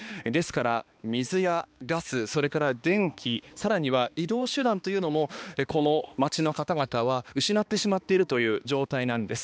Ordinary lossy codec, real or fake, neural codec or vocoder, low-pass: none; fake; codec, 16 kHz, 2 kbps, X-Codec, HuBERT features, trained on balanced general audio; none